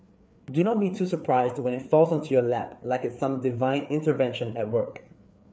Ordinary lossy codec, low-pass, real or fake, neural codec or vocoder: none; none; fake; codec, 16 kHz, 4 kbps, FreqCodec, larger model